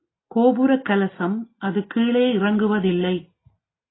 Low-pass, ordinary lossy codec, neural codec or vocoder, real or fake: 7.2 kHz; AAC, 16 kbps; none; real